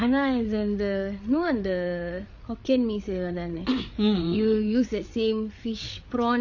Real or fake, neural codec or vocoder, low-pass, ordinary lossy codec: fake; codec, 16 kHz, 8 kbps, FreqCodec, larger model; 7.2 kHz; Opus, 64 kbps